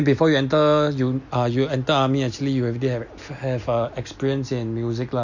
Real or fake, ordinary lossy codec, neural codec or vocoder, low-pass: real; none; none; 7.2 kHz